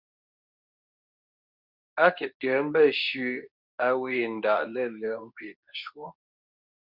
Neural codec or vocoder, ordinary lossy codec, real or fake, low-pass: codec, 24 kHz, 0.9 kbps, WavTokenizer, medium speech release version 2; Opus, 64 kbps; fake; 5.4 kHz